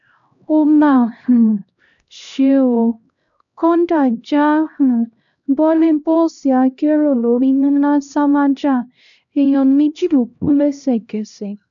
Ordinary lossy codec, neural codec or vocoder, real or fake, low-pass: none; codec, 16 kHz, 1 kbps, X-Codec, HuBERT features, trained on LibriSpeech; fake; 7.2 kHz